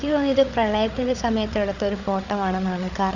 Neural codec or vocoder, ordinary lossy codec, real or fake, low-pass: codec, 16 kHz, 8 kbps, FreqCodec, larger model; none; fake; 7.2 kHz